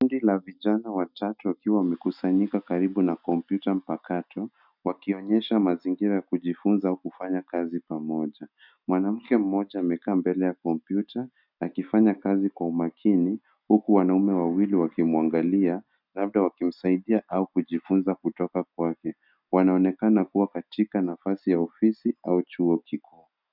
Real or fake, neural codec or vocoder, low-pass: real; none; 5.4 kHz